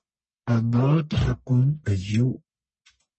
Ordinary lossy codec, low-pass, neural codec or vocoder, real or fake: MP3, 32 kbps; 10.8 kHz; codec, 44.1 kHz, 1.7 kbps, Pupu-Codec; fake